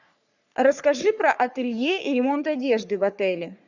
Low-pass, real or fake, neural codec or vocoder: 7.2 kHz; fake; codec, 44.1 kHz, 3.4 kbps, Pupu-Codec